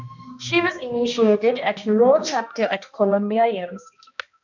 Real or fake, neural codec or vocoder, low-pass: fake; codec, 16 kHz, 1 kbps, X-Codec, HuBERT features, trained on balanced general audio; 7.2 kHz